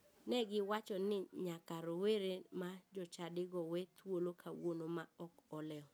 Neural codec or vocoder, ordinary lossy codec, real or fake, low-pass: none; none; real; none